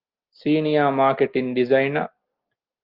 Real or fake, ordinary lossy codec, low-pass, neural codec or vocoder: real; Opus, 32 kbps; 5.4 kHz; none